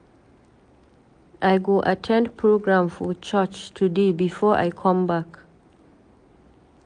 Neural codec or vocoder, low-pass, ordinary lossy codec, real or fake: none; 9.9 kHz; AAC, 64 kbps; real